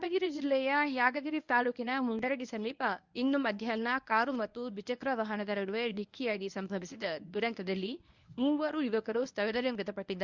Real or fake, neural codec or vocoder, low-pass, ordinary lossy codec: fake; codec, 24 kHz, 0.9 kbps, WavTokenizer, medium speech release version 1; 7.2 kHz; Opus, 64 kbps